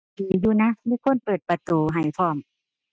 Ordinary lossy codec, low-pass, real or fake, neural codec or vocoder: none; none; real; none